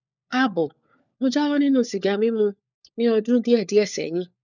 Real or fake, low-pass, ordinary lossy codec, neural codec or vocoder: fake; 7.2 kHz; none; codec, 16 kHz, 4 kbps, FunCodec, trained on LibriTTS, 50 frames a second